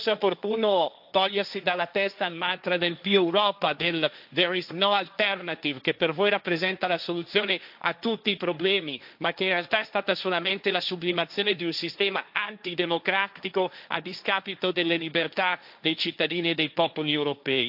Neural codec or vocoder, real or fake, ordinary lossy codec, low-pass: codec, 16 kHz, 1.1 kbps, Voila-Tokenizer; fake; AAC, 48 kbps; 5.4 kHz